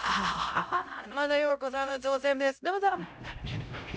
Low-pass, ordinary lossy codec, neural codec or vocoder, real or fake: none; none; codec, 16 kHz, 0.5 kbps, X-Codec, HuBERT features, trained on LibriSpeech; fake